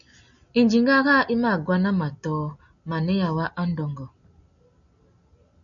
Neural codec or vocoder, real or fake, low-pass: none; real; 7.2 kHz